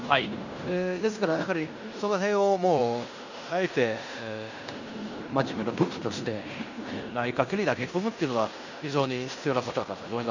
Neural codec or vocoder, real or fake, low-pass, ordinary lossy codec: codec, 16 kHz in and 24 kHz out, 0.9 kbps, LongCat-Audio-Codec, fine tuned four codebook decoder; fake; 7.2 kHz; none